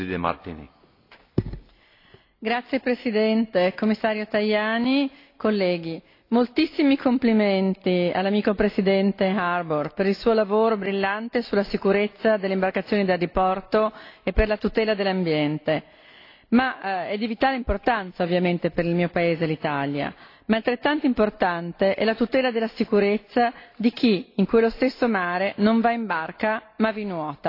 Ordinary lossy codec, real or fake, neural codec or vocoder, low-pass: AAC, 32 kbps; real; none; 5.4 kHz